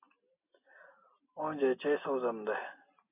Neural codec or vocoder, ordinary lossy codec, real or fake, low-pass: none; AAC, 32 kbps; real; 3.6 kHz